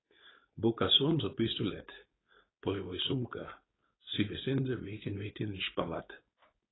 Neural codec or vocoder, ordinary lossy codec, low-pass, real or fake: codec, 16 kHz, 4.8 kbps, FACodec; AAC, 16 kbps; 7.2 kHz; fake